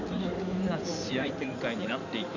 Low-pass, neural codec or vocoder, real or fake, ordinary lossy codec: 7.2 kHz; codec, 16 kHz in and 24 kHz out, 2.2 kbps, FireRedTTS-2 codec; fake; none